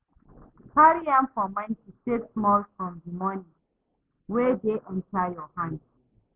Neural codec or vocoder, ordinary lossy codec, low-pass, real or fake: none; Opus, 16 kbps; 3.6 kHz; real